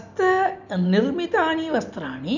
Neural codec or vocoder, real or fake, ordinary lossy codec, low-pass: none; real; none; 7.2 kHz